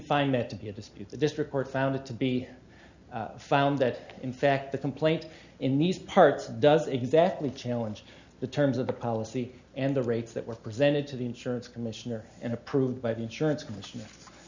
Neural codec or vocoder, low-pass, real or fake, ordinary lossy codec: none; 7.2 kHz; real; Opus, 64 kbps